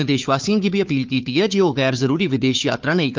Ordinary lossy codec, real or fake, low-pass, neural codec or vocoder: Opus, 24 kbps; fake; 7.2 kHz; codec, 16 kHz, 4 kbps, FunCodec, trained on Chinese and English, 50 frames a second